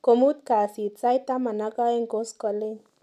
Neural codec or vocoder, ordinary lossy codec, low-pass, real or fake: none; none; 14.4 kHz; real